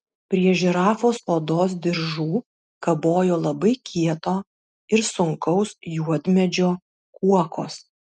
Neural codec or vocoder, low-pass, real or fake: none; 10.8 kHz; real